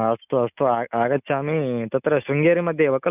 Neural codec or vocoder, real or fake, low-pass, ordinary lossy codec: none; real; 3.6 kHz; none